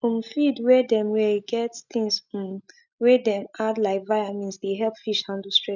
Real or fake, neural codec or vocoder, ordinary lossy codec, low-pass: real; none; none; 7.2 kHz